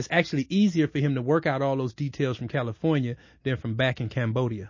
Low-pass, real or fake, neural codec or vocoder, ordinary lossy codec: 7.2 kHz; real; none; MP3, 32 kbps